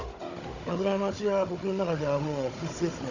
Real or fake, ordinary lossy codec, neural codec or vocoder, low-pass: fake; none; codec, 16 kHz, 16 kbps, FunCodec, trained on Chinese and English, 50 frames a second; 7.2 kHz